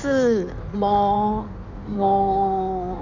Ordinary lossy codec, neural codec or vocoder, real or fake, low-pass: none; codec, 16 kHz in and 24 kHz out, 1.1 kbps, FireRedTTS-2 codec; fake; 7.2 kHz